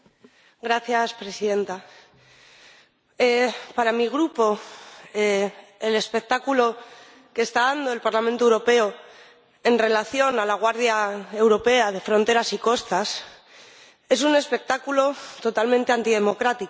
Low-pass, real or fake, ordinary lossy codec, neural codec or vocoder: none; real; none; none